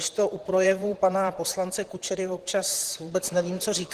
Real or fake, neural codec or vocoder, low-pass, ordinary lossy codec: fake; vocoder, 44.1 kHz, 128 mel bands, Pupu-Vocoder; 14.4 kHz; Opus, 16 kbps